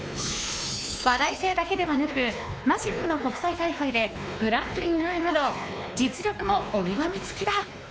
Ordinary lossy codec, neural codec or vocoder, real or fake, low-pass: none; codec, 16 kHz, 2 kbps, X-Codec, WavLM features, trained on Multilingual LibriSpeech; fake; none